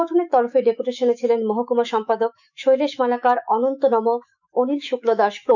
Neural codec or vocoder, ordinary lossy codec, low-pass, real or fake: autoencoder, 48 kHz, 128 numbers a frame, DAC-VAE, trained on Japanese speech; none; 7.2 kHz; fake